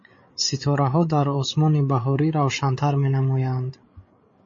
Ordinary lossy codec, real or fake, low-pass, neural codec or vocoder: MP3, 32 kbps; fake; 7.2 kHz; codec, 16 kHz, 8 kbps, FreqCodec, larger model